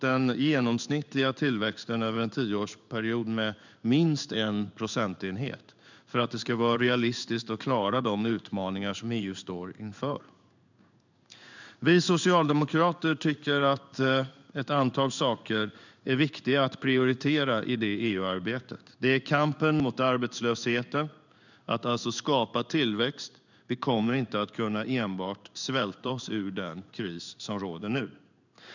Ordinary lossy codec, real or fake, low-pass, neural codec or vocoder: none; fake; 7.2 kHz; codec, 16 kHz in and 24 kHz out, 1 kbps, XY-Tokenizer